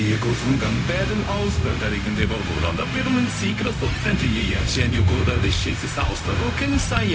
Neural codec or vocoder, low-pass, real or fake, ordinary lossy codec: codec, 16 kHz, 0.4 kbps, LongCat-Audio-Codec; none; fake; none